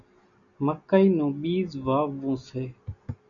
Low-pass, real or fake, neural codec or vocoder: 7.2 kHz; real; none